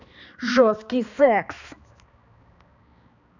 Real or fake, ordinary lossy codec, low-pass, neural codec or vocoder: fake; none; 7.2 kHz; codec, 16 kHz, 2 kbps, X-Codec, HuBERT features, trained on balanced general audio